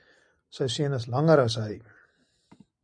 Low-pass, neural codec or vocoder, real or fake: 9.9 kHz; none; real